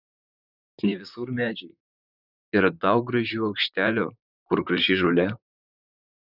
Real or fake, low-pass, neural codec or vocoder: fake; 5.4 kHz; vocoder, 44.1 kHz, 128 mel bands, Pupu-Vocoder